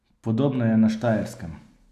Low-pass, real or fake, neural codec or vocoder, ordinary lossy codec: 14.4 kHz; real; none; none